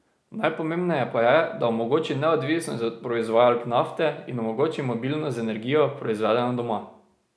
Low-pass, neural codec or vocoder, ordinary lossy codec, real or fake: none; none; none; real